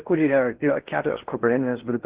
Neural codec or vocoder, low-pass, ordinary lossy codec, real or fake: codec, 16 kHz in and 24 kHz out, 0.6 kbps, FocalCodec, streaming, 4096 codes; 3.6 kHz; Opus, 16 kbps; fake